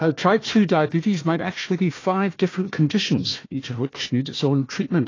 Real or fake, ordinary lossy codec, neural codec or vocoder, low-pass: fake; AAC, 32 kbps; codec, 16 kHz, 1 kbps, FunCodec, trained on Chinese and English, 50 frames a second; 7.2 kHz